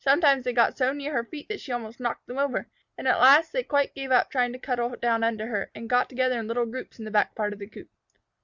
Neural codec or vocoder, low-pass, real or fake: none; 7.2 kHz; real